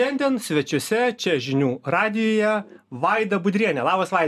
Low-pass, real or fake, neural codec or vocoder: 14.4 kHz; real; none